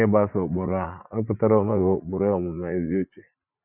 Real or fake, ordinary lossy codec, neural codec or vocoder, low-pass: fake; none; vocoder, 44.1 kHz, 80 mel bands, Vocos; 3.6 kHz